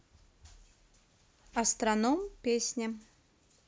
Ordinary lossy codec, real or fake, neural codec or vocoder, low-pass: none; real; none; none